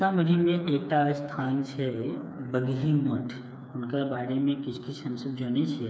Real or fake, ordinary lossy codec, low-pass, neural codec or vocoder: fake; none; none; codec, 16 kHz, 4 kbps, FreqCodec, smaller model